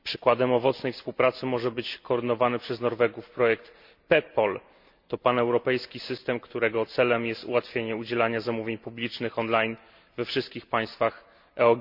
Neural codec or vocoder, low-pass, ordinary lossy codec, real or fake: none; 5.4 kHz; MP3, 48 kbps; real